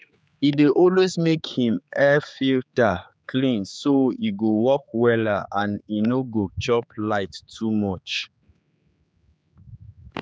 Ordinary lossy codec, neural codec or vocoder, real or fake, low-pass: none; codec, 16 kHz, 4 kbps, X-Codec, HuBERT features, trained on general audio; fake; none